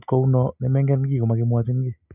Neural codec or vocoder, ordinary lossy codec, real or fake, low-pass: none; none; real; 3.6 kHz